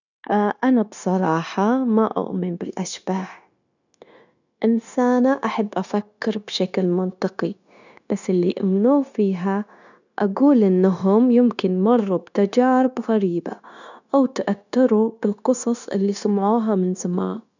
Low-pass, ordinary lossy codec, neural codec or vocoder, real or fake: 7.2 kHz; none; codec, 16 kHz, 0.9 kbps, LongCat-Audio-Codec; fake